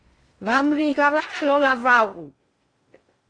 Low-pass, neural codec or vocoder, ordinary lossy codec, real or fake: 9.9 kHz; codec, 16 kHz in and 24 kHz out, 0.6 kbps, FocalCodec, streaming, 2048 codes; AAC, 32 kbps; fake